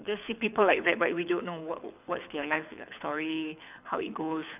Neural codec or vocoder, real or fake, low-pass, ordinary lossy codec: codec, 24 kHz, 6 kbps, HILCodec; fake; 3.6 kHz; none